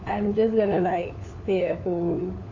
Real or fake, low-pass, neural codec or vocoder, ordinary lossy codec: fake; 7.2 kHz; codec, 16 kHz, 4 kbps, FunCodec, trained on LibriTTS, 50 frames a second; AAC, 48 kbps